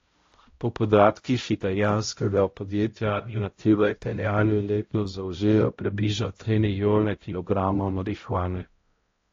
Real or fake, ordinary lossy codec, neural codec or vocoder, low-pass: fake; AAC, 32 kbps; codec, 16 kHz, 0.5 kbps, X-Codec, HuBERT features, trained on balanced general audio; 7.2 kHz